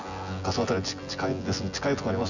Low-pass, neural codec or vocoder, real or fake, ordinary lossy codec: 7.2 kHz; vocoder, 24 kHz, 100 mel bands, Vocos; fake; none